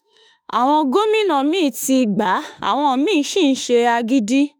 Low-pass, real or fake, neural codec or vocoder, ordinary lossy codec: none; fake; autoencoder, 48 kHz, 32 numbers a frame, DAC-VAE, trained on Japanese speech; none